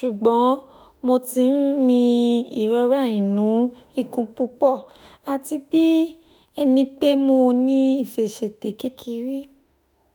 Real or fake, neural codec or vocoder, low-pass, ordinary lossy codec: fake; autoencoder, 48 kHz, 32 numbers a frame, DAC-VAE, trained on Japanese speech; none; none